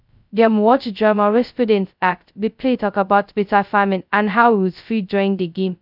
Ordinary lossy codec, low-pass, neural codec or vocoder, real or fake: none; 5.4 kHz; codec, 16 kHz, 0.2 kbps, FocalCodec; fake